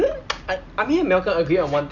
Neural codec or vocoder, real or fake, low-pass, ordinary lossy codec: none; real; 7.2 kHz; none